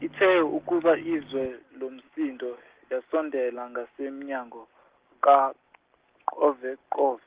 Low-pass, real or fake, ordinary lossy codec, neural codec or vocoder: 3.6 kHz; real; Opus, 16 kbps; none